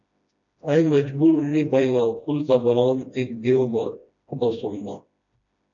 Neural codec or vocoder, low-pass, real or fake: codec, 16 kHz, 1 kbps, FreqCodec, smaller model; 7.2 kHz; fake